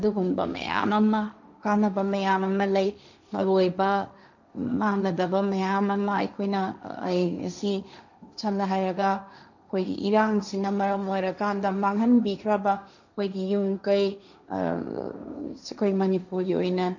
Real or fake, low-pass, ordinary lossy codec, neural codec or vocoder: fake; 7.2 kHz; none; codec, 16 kHz, 1.1 kbps, Voila-Tokenizer